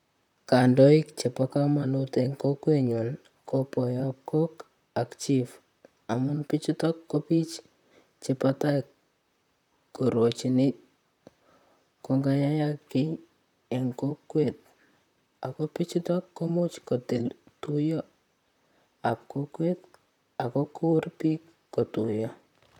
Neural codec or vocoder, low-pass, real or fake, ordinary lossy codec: vocoder, 44.1 kHz, 128 mel bands, Pupu-Vocoder; 19.8 kHz; fake; none